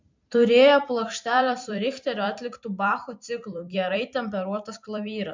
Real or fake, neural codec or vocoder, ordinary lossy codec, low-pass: fake; vocoder, 44.1 kHz, 128 mel bands every 256 samples, BigVGAN v2; MP3, 64 kbps; 7.2 kHz